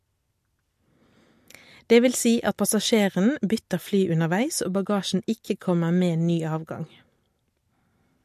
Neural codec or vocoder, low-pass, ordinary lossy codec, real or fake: none; 14.4 kHz; MP3, 64 kbps; real